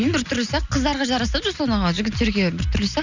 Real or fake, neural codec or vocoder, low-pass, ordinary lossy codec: real; none; 7.2 kHz; none